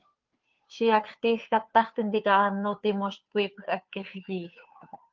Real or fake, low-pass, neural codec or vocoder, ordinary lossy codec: fake; 7.2 kHz; codec, 16 kHz, 2 kbps, FunCodec, trained on Chinese and English, 25 frames a second; Opus, 32 kbps